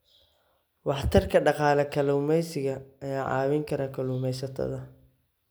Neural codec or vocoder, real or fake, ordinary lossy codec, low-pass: none; real; none; none